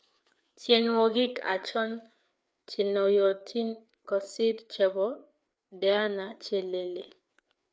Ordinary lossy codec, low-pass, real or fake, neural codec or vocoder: none; none; fake; codec, 16 kHz, 4 kbps, FreqCodec, larger model